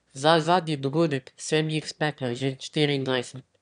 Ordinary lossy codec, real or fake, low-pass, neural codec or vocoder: none; fake; 9.9 kHz; autoencoder, 22.05 kHz, a latent of 192 numbers a frame, VITS, trained on one speaker